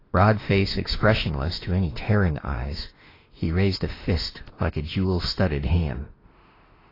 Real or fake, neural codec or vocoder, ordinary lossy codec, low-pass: fake; autoencoder, 48 kHz, 32 numbers a frame, DAC-VAE, trained on Japanese speech; AAC, 24 kbps; 5.4 kHz